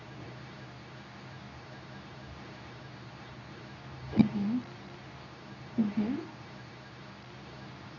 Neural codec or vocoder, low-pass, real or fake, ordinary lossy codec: codec, 24 kHz, 0.9 kbps, WavTokenizer, medium speech release version 2; 7.2 kHz; fake; none